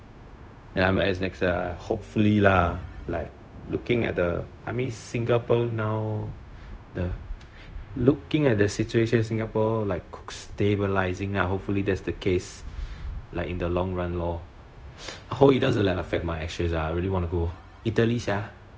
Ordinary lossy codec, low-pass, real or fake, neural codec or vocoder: none; none; fake; codec, 16 kHz, 0.4 kbps, LongCat-Audio-Codec